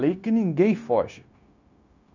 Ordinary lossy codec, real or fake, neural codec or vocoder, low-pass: none; fake; codec, 16 kHz, 0.9 kbps, LongCat-Audio-Codec; 7.2 kHz